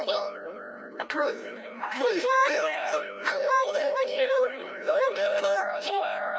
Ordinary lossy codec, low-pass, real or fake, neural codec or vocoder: none; none; fake; codec, 16 kHz, 0.5 kbps, FreqCodec, larger model